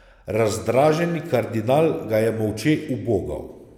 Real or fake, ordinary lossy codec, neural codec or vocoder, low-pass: real; none; none; 19.8 kHz